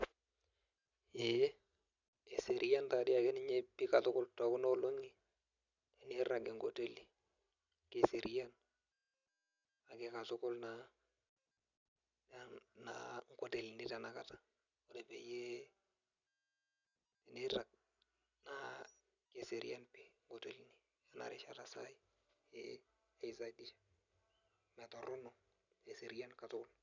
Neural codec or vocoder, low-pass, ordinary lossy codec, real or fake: none; 7.2 kHz; none; real